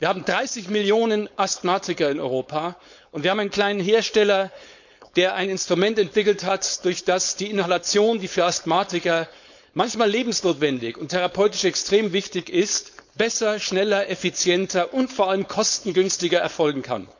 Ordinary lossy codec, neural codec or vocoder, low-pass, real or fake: none; codec, 16 kHz, 4.8 kbps, FACodec; 7.2 kHz; fake